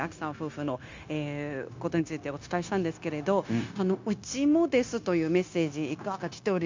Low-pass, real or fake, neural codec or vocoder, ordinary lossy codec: 7.2 kHz; fake; codec, 16 kHz, 0.9 kbps, LongCat-Audio-Codec; MP3, 64 kbps